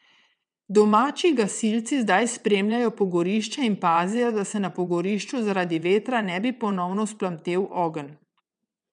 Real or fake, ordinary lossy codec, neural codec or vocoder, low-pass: fake; none; vocoder, 22.05 kHz, 80 mel bands, WaveNeXt; 9.9 kHz